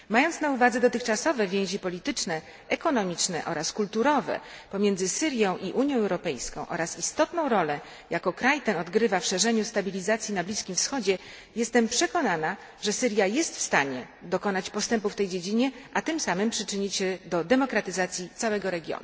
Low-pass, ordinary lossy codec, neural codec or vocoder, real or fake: none; none; none; real